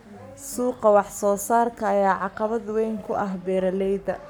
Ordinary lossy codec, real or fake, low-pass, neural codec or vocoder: none; fake; none; codec, 44.1 kHz, 7.8 kbps, Pupu-Codec